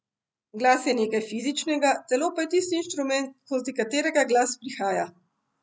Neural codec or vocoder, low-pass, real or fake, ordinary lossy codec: none; none; real; none